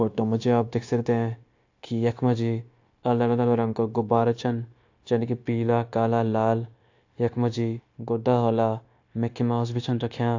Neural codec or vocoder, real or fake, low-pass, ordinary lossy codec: codec, 16 kHz, 0.9 kbps, LongCat-Audio-Codec; fake; 7.2 kHz; AAC, 48 kbps